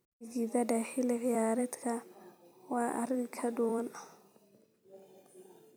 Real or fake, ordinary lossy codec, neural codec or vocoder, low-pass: fake; none; vocoder, 44.1 kHz, 128 mel bands every 256 samples, BigVGAN v2; none